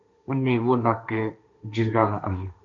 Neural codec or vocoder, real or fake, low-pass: codec, 16 kHz, 1.1 kbps, Voila-Tokenizer; fake; 7.2 kHz